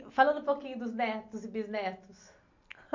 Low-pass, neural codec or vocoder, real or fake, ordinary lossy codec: 7.2 kHz; none; real; MP3, 48 kbps